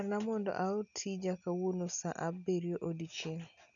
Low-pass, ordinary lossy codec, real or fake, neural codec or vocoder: 7.2 kHz; none; real; none